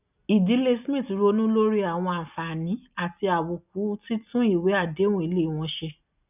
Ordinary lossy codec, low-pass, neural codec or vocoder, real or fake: none; 3.6 kHz; none; real